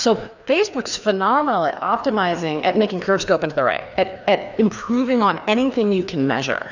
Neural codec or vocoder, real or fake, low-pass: codec, 16 kHz, 2 kbps, FreqCodec, larger model; fake; 7.2 kHz